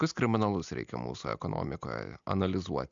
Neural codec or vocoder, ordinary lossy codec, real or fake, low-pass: none; MP3, 64 kbps; real; 7.2 kHz